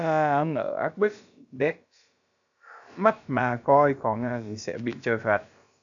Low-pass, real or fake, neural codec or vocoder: 7.2 kHz; fake; codec, 16 kHz, about 1 kbps, DyCAST, with the encoder's durations